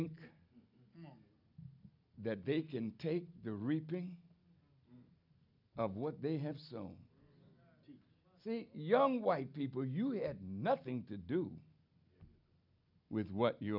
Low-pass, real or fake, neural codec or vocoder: 5.4 kHz; real; none